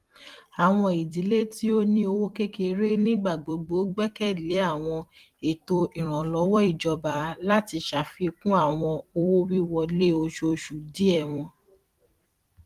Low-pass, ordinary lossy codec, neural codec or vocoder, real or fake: 14.4 kHz; Opus, 24 kbps; vocoder, 44.1 kHz, 128 mel bands every 256 samples, BigVGAN v2; fake